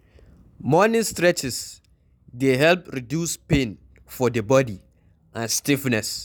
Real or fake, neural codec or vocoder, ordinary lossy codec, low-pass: real; none; none; none